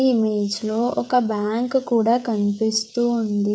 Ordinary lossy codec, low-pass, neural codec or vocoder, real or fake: none; none; codec, 16 kHz, 16 kbps, FreqCodec, smaller model; fake